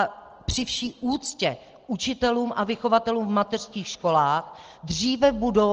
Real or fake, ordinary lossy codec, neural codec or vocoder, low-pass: real; Opus, 16 kbps; none; 7.2 kHz